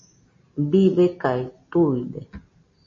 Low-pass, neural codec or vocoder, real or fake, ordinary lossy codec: 7.2 kHz; none; real; MP3, 32 kbps